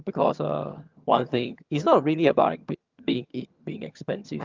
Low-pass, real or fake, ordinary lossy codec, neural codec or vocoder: 7.2 kHz; fake; Opus, 24 kbps; vocoder, 22.05 kHz, 80 mel bands, HiFi-GAN